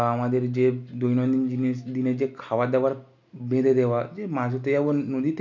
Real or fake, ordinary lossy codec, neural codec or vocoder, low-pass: real; none; none; 7.2 kHz